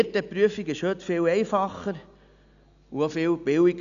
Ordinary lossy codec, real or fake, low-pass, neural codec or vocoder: none; real; 7.2 kHz; none